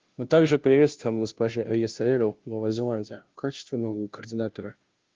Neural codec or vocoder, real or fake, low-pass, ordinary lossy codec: codec, 16 kHz, 0.5 kbps, FunCodec, trained on Chinese and English, 25 frames a second; fake; 7.2 kHz; Opus, 32 kbps